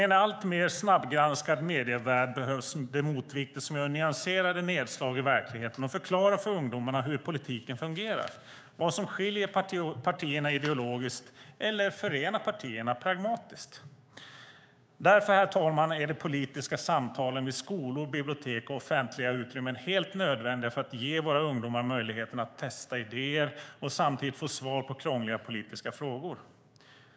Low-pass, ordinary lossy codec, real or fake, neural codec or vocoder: none; none; fake; codec, 16 kHz, 6 kbps, DAC